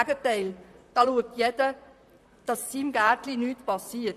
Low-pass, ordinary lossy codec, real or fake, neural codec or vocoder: 14.4 kHz; none; fake; vocoder, 44.1 kHz, 128 mel bands, Pupu-Vocoder